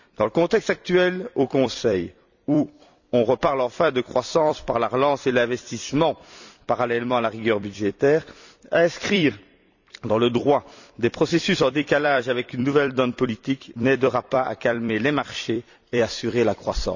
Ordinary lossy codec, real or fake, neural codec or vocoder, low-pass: none; fake; vocoder, 44.1 kHz, 128 mel bands every 256 samples, BigVGAN v2; 7.2 kHz